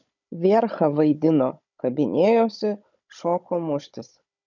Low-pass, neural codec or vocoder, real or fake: 7.2 kHz; codec, 16 kHz, 16 kbps, FunCodec, trained on Chinese and English, 50 frames a second; fake